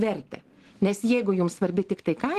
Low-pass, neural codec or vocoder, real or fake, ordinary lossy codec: 14.4 kHz; none; real; Opus, 16 kbps